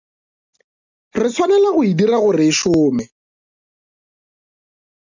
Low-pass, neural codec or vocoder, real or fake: 7.2 kHz; none; real